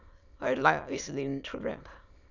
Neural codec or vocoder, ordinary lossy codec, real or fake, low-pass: autoencoder, 22.05 kHz, a latent of 192 numbers a frame, VITS, trained on many speakers; none; fake; 7.2 kHz